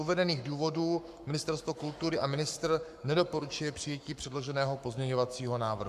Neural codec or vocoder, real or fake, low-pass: codec, 44.1 kHz, 7.8 kbps, Pupu-Codec; fake; 14.4 kHz